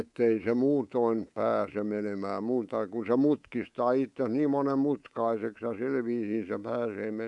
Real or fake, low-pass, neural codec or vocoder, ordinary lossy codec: fake; 10.8 kHz; codec, 24 kHz, 3.1 kbps, DualCodec; none